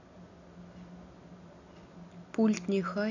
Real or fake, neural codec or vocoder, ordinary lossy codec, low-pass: real; none; none; 7.2 kHz